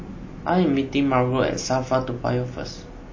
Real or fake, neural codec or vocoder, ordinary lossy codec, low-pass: real; none; MP3, 32 kbps; 7.2 kHz